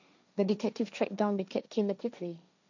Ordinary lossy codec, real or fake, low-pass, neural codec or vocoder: none; fake; 7.2 kHz; codec, 16 kHz, 1.1 kbps, Voila-Tokenizer